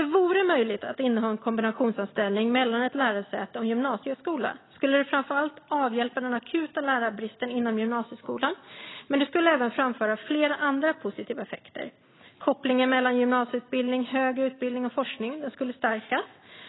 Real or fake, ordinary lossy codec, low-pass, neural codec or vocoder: real; AAC, 16 kbps; 7.2 kHz; none